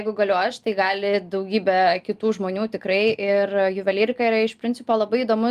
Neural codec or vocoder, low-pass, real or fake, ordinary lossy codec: none; 14.4 kHz; real; Opus, 32 kbps